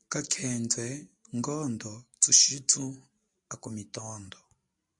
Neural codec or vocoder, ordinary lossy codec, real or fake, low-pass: none; MP3, 64 kbps; real; 10.8 kHz